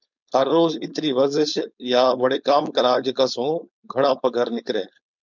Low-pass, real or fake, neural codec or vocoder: 7.2 kHz; fake; codec, 16 kHz, 4.8 kbps, FACodec